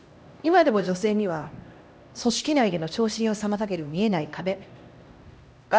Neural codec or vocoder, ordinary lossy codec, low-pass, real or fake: codec, 16 kHz, 1 kbps, X-Codec, HuBERT features, trained on LibriSpeech; none; none; fake